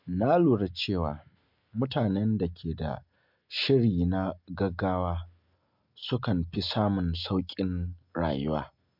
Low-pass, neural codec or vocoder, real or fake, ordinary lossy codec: 5.4 kHz; none; real; MP3, 48 kbps